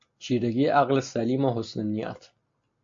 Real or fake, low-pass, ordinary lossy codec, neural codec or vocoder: real; 7.2 kHz; MP3, 48 kbps; none